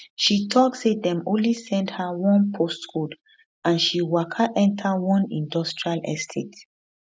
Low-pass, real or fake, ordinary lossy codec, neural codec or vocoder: none; real; none; none